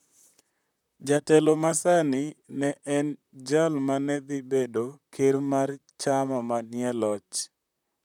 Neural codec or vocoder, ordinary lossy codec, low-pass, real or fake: vocoder, 44.1 kHz, 128 mel bands, Pupu-Vocoder; none; 19.8 kHz; fake